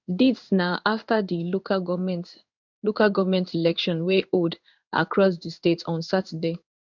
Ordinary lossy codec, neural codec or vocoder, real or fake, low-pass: none; codec, 16 kHz in and 24 kHz out, 1 kbps, XY-Tokenizer; fake; 7.2 kHz